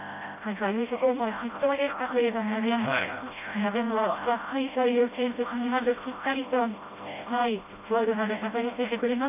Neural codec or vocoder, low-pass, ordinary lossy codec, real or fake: codec, 16 kHz, 0.5 kbps, FreqCodec, smaller model; 3.6 kHz; none; fake